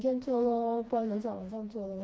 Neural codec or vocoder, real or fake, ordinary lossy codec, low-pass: codec, 16 kHz, 2 kbps, FreqCodec, smaller model; fake; none; none